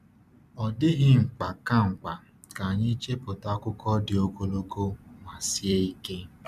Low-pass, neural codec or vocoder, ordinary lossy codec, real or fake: 14.4 kHz; vocoder, 44.1 kHz, 128 mel bands every 256 samples, BigVGAN v2; none; fake